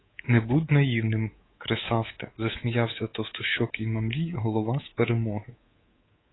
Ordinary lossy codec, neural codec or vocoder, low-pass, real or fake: AAC, 16 kbps; codec, 24 kHz, 3.1 kbps, DualCodec; 7.2 kHz; fake